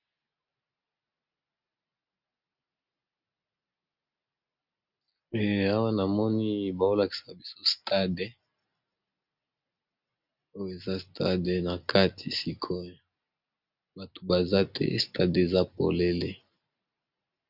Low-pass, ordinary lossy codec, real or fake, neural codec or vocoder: 5.4 kHz; Opus, 64 kbps; real; none